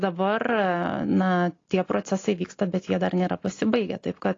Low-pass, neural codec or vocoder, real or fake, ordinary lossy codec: 7.2 kHz; none; real; AAC, 32 kbps